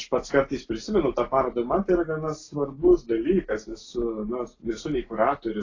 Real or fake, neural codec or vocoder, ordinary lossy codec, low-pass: real; none; AAC, 32 kbps; 7.2 kHz